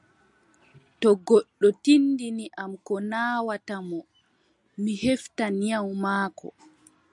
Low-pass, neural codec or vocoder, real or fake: 10.8 kHz; none; real